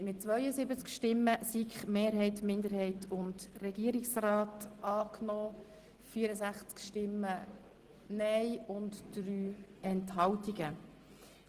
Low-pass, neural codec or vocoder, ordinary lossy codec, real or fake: 14.4 kHz; none; Opus, 16 kbps; real